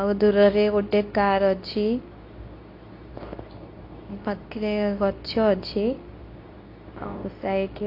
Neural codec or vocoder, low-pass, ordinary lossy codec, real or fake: codec, 24 kHz, 0.9 kbps, WavTokenizer, medium speech release version 2; 5.4 kHz; AAC, 32 kbps; fake